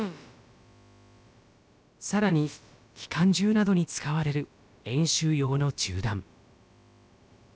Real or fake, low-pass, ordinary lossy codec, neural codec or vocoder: fake; none; none; codec, 16 kHz, about 1 kbps, DyCAST, with the encoder's durations